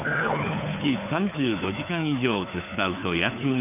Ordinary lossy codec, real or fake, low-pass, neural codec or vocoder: none; fake; 3.6 kHz; codec, 16 kHz, 4 kbps, FunCodec, trained on LibriTTS, 50 frames a second